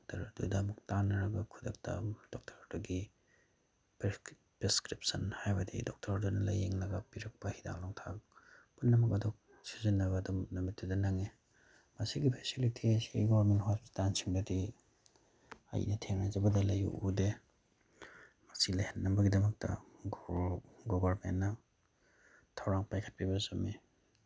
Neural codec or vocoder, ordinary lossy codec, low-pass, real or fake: none; none; none; real